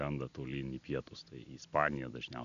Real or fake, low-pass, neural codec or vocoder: real; 7.2 kHz; none